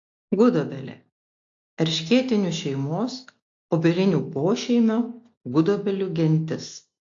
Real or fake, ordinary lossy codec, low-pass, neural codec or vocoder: real; AAC, 48 kbps; 7.2 kHz; none